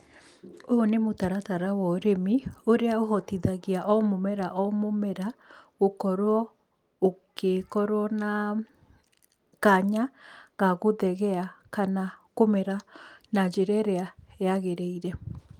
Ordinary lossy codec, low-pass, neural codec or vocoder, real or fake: Opus, 32 kbps; 19.8 kHz; none; real